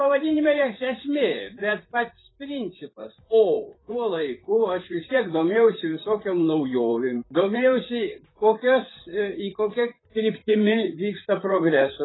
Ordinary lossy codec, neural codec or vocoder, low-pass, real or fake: AAC, 16 kbps; vocoder, 44.1 kHz, 128 mel bands, Pupu-Vocoder; 7.2 kHz; fake